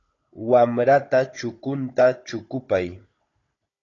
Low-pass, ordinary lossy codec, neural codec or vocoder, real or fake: 7.2 kHz; AAC, 32 kbps; codec, 16 kHz, 16 kbps, FunCodec, trained on Chinese and English, 50 frames a second; fake